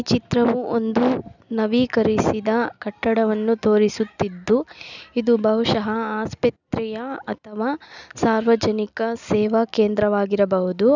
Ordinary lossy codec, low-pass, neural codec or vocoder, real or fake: none; 7.2 kHz; none; real